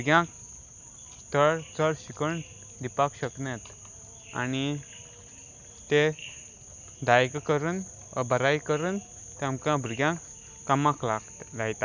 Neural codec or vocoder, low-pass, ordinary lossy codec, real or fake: none; 7.2 kHz; none; real